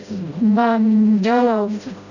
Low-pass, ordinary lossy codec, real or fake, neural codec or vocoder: 7.2 kHz; none; fake; codec, 16 kHz, 0.5 kbps, FreqCodec, smaller model